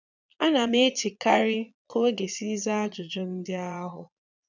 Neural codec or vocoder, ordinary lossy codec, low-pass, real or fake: vocoder, 22.05 kHz, 80 mel bands, Vocos; none; 7.2 kHz; fake